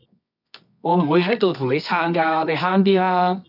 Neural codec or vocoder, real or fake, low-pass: codec, 24 kHz, 0.9 kbps, WavTokenizer, medium music audio release; fake; 5.4 kHz